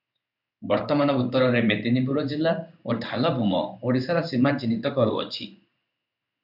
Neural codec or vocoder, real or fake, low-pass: codec, 16 kHz in and 24 kHz out, 1 kbps, XY-Tokenizer; fake; 5.4 kHz